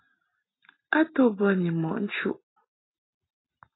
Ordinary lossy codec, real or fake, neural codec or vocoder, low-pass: AAC, 16 kbps; real; none; 7.2 kHz